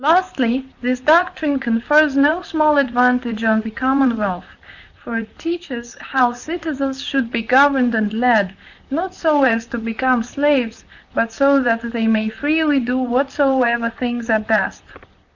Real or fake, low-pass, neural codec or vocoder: fake; 7.2 kHz; vocoder, 44.1 kHz, 128 mel bands, Pupu-Vocoder